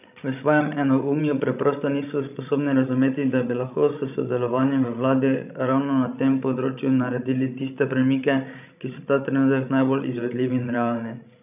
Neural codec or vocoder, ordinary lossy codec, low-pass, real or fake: codec, 16 kHz, 16 kbps, FreqCodec, larger model; none; 3.6 kHz; fake